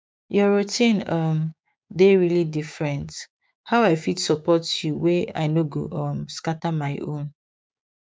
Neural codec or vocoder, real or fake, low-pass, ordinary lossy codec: codec, 16 kHz, 6 kbps, DAC; fake; none; none